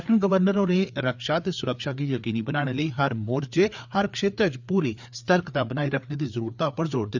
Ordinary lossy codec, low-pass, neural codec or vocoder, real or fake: none; 7.2 kHz; codec, 16 kHz, 4 kbps, FreqCodec, larger model; fake